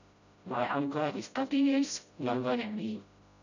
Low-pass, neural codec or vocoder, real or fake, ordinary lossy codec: 7.2 kHz; codec, 16 kHz, 0.5 kbps, FreqCodec, smaller model; fake; none